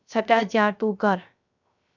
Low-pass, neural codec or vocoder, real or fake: 7.2 kHz; codec, 16 kHz, 0.3 kbps, FocalCodec; fake